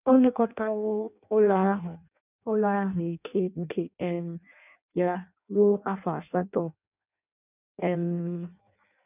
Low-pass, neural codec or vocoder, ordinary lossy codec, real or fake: 3.6 kHz; codec, 16 kHz in and 24 kHz out, 0.6 kbps, FireRedTTS-2 codec; none; fake